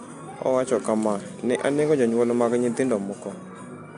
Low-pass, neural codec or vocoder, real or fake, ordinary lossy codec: 10.8 kHz; none; real; AAC, 64 kbps